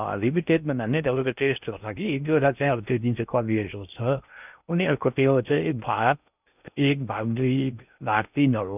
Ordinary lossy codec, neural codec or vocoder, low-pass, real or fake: none; codec, 16 kHz in and 24 kHz out, 0.6 kbps, FocalCodec, streaming, 2048 codes; 3.6 kHz; fake